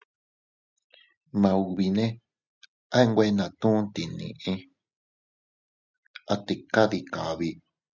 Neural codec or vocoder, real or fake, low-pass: none; real; 7.2 kHz